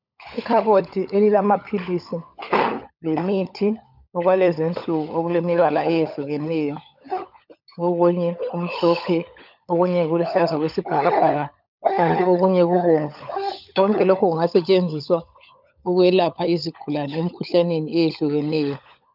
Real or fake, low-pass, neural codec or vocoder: fake; 5.4 kHz; codec, 16 kHz, 16 kbps, FunCodec, trained on LibriTTS, 50 frames a second